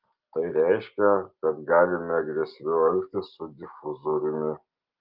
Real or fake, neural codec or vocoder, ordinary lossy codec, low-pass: real; none; Opus, 32 kbps; 5.4 kHz